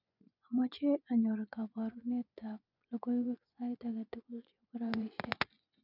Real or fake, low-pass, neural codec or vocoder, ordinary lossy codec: fake; 5.4 kHz; vocoder, 24 kHz, 100 mel bands, Vocos; none